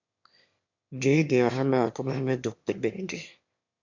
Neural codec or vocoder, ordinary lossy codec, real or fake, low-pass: autoencoder, 22.05 kHz, a latent of 192 numbers a frame, VITS, trained on one speaker; MP3, 64 kbps; fake; 7.2 kHz